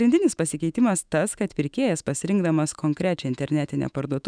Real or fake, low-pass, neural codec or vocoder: fake; 9.9 kHz; autoencoder, 48 kHz, 128 numbers a frame, DAC-VAE, trained on Japanese speech